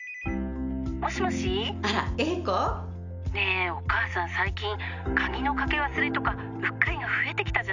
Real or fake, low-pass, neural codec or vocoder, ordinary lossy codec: real; 7.2 kHz; none; none